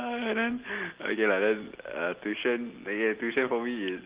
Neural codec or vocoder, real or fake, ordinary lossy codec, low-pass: none; real; Opus, 16 kbps; 3.6 kHz